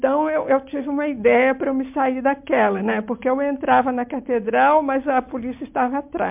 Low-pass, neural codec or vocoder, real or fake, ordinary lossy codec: 3.6 kHz; none; real; MP3, 32 kbps